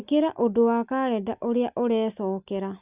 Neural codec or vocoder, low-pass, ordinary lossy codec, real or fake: none; 3.6 kHz; Opus, 64 kbps; real